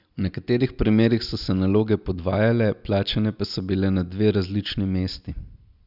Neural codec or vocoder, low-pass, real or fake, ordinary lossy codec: none; 5.4 kHz; real; none